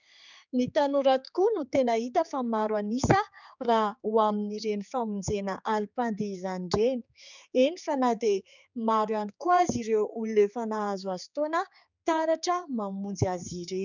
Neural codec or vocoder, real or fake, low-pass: codec, 16 kHz, 4 kbps, X-Codec, HuBERT features, trained on general audio; fake; 7.2 kHz